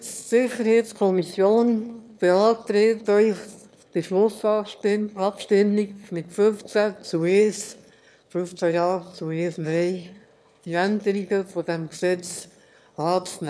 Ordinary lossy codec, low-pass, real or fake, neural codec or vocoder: none; none; fake; autoencoder, 22.05 kHz, a latent of 192 numbers a frame, VITS, trained on one speaker